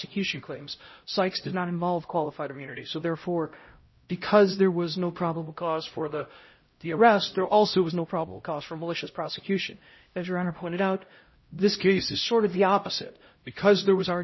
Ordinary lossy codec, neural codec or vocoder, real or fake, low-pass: MP3, 24 kbps; codec, 16 kHz, 0.5 kbps, X-Codec, HuBERT features, trained on LibriSpeech; fake; 7.2 kHz